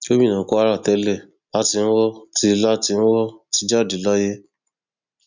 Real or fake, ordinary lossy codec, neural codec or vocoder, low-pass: real; none; none; 7.2 kHz